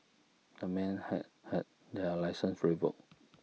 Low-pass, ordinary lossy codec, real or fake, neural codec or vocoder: none; none; real; none